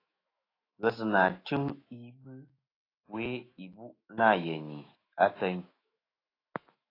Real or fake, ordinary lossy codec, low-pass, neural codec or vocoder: fake; AAC, 24 kbps; 5.4 kHz; autoencoder, 48 kHz, 128 numbers a frame, DAC-VAE, trained on Japanese speech